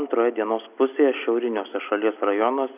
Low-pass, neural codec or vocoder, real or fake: 3.6 kHz; none; real